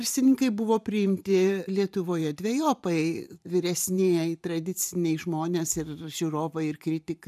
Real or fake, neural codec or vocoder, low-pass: fake; vocoder, 44.1 kHz, 128 mel bands every 512 samples, BigVGAN v2; 14.4 kHz